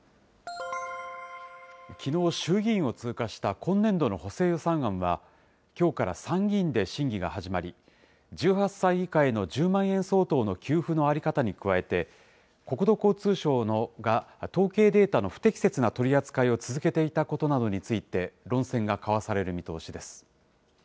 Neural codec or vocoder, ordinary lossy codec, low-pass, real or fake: none; none; none; real